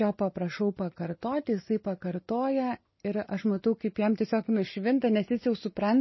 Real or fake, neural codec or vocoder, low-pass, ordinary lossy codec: real; none; 7.2 kHz; MP3, 24 kbps